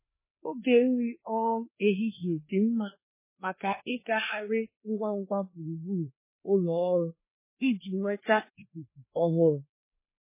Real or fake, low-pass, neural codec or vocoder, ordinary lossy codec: fake; 3.6 kHz; codec, 16 kHz, 2 kbps, FreqCodec, larger model; MP3, 16 kbps